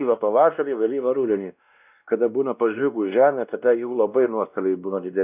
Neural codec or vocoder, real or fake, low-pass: codec, 16 kHz, 1 kbps, X-Codec, WavLM features, trained on Multilingual LibriSpeech; fake; 3.6 kHz